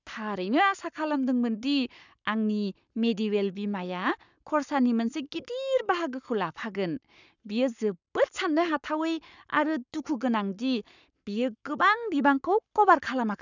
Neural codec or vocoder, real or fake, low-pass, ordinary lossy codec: codec, 44.1 kHz, 7.8 kbps, Pupu-Codec; fake; 7.2 kHz; none